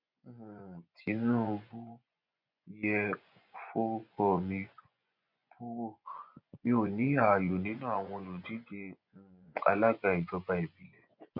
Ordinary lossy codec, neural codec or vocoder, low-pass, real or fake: none; vocoder, 24 kHz, 100 mel bands, Vocos; 5.4 kHz; fake